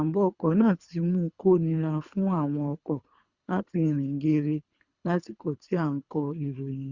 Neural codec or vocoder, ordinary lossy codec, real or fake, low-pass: codec, 24 kHz, 3 kbps, HILCodec; Opus, 64 kbps; fake; 7.2 kHz